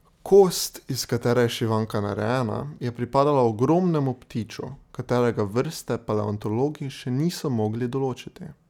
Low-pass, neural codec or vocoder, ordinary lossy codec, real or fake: 19.8 kHz; none; none; real